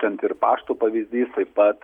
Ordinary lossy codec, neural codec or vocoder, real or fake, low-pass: Opus, 64 kbps; none; real; 19.8 kHz